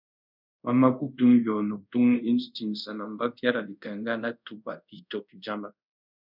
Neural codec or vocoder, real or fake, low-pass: codec, 24 kHz, 0.5 kbps, DualCodec; fake; 5.4 kHz